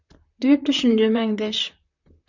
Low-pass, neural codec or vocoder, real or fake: 7.2 kHz; vocoder, 44.1 kHz, 128 mel bands, Pupu-Vocoder; fake